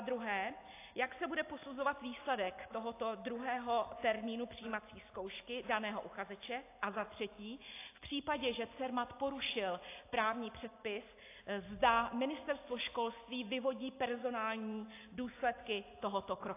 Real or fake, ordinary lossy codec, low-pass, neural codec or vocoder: real; AAC, 24 kbps; 3.6 kHz; none